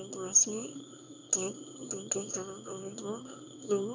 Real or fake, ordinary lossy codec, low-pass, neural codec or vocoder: fake; none; 7.2 kHz; autoencoder, 22.05 kHz, a latent of 192 numbers a frame, VITS, trained on one speaker